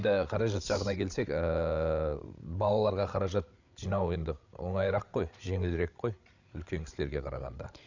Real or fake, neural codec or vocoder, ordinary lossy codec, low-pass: fake; codec, 16 kHz, 8 kbps, FreqCodec, larger model; none; 7.2 kHz